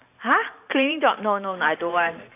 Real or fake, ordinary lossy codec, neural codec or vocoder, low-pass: fake; AAC, 24 kbps; vocoder, 44.1 kHz, 128 mel bands every 512 samples, BigVGAN v2; 3.6 kHz